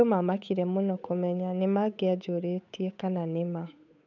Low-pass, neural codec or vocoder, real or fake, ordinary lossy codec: 7.2 kHz; codec, 16 kHz, 8 kbps, FunCodec, trained on Chinese and English, 25 frames a second; fake; none